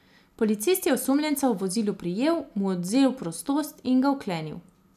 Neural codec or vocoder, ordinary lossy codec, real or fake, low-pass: none; none; real; 14.4 kHz